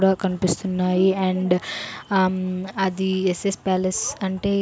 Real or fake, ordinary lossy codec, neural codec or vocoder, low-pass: real; none; none; none